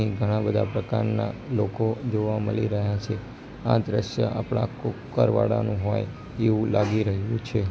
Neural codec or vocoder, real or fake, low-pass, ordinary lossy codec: none; real; none; none